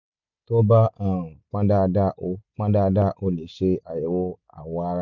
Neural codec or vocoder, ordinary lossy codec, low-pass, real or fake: none; none; 7.2 kHz; real